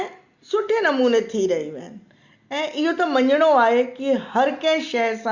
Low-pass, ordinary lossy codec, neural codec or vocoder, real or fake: 7.2 kHz; Opus, 64 kbps; none; real